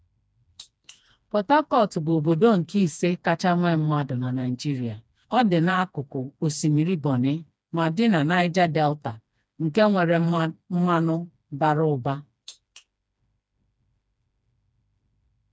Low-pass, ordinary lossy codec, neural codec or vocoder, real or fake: none; none; codec, 16 kHz, 2 kbps, FreqCodec, smaller model; fake